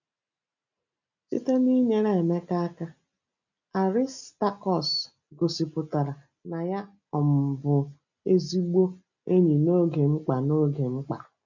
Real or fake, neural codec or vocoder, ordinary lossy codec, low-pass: real; none; none; 7.2 kHz